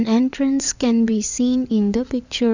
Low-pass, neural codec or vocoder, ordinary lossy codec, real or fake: 7.2 kHz; codec, 16 kHz in and 24 kHz out, 2.2 kbps, FireRedTTS-2 codec; none; fake